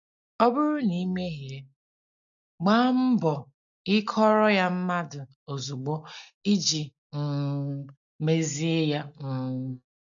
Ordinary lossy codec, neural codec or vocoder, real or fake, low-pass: AAC, 64 kbps; none; real; 7.2 kHz